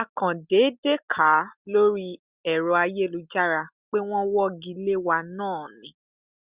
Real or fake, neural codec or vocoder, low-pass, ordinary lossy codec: real; none; 3.6 kHz; Opus, 64 kbps